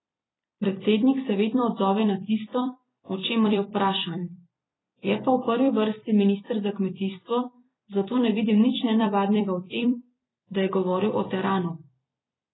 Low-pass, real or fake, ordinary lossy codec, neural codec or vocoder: 7.2 kHz; fake; AAC, 16 kbps; vocoder, 44.1 kHz, 128 mel bands every 256 samples, BigVGAN v2